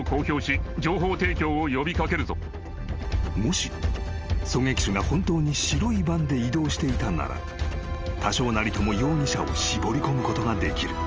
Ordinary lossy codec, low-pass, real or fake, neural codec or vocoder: Opus, 24 kbps; 7.2 kHz; real; none